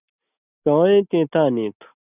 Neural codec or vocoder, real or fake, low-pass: none; real; 3.6 kHz